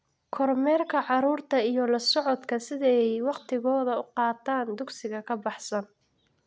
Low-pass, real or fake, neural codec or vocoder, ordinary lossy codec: none; real; none; none